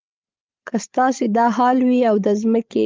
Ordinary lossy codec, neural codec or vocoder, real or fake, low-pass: Opus, 24 kbps; codec, 16 kHz, 16 kbps, FreqCodec, larger model; fake; 7.2 kHz